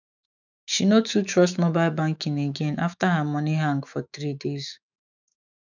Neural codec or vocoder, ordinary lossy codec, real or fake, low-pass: autoencoder, 48 kHz, 128 numbers a frame, DAC-VAE, trained on Japanese speech; none; fake; 7.2 kHz